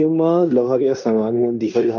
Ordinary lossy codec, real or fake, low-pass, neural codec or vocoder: none; fake; 7.2 kHz; codec, 16 kHz in and 24 kHz out, 0.9 kbps, LongCat-Audio-Codec, fine tuned four codebook decoder